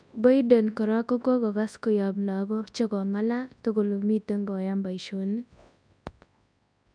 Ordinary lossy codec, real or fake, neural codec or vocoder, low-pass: none; fake; codec, 24 kHz, 0.9 kbps, WavTokenizer, large speech release; 9.9 kHz